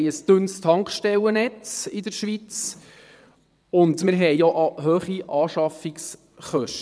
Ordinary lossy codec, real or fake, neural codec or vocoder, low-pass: none; fake; vocoder, 22.05 kHz, 80 mel bands, WaveNeXt; none